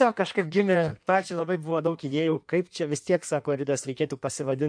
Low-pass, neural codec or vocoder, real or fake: 9.9 kHz; codec, 16 kHz in and 24 kHz out, 1.1 kbps, FireRedTTS-2 codec; fake